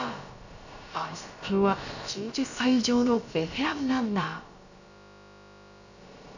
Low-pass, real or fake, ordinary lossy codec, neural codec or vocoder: 7.2 kHz; fake; none; codec, 16 kHz, about 1 kbps, DyCAST, with the encoder's durations